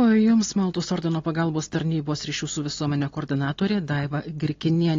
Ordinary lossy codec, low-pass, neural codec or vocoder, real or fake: AAC, 32 kbps; 7.2 kHz; none; real